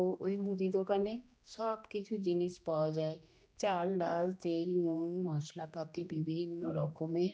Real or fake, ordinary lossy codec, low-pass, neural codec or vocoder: fake; none; none; codec, 16 kHz, 1 kbps, X-Codec, HuBERT features, trained on general audio